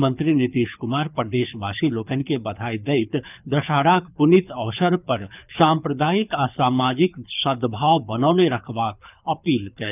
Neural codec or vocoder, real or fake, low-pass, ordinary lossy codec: codec, 24 kHz, 6 kbps, HILCodec; fake; 3.6 kHz; none